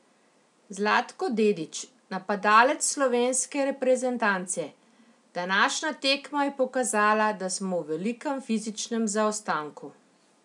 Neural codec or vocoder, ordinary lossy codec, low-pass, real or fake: none; none; 10.8 kHz; real